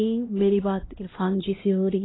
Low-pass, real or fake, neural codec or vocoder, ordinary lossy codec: 7.2 kHz; fake; codec, 16 kHz, 1 kbps, X-Codec, HuBERT features, trained on LibriSpeech; AAC, 16 kbps